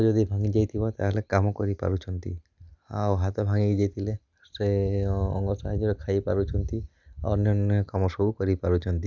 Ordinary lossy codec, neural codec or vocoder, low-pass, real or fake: Opus, 64 kbps; none; 7.2 kHz; real